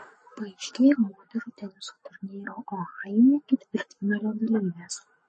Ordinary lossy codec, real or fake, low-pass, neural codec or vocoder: MP3, 32 kbps; fake; 10.8 kHz; codec, 44.1 kHz, 7.8 kbps, Pupu-Codec